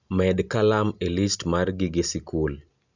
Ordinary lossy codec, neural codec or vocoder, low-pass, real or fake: none; none; 7.2 kHz; real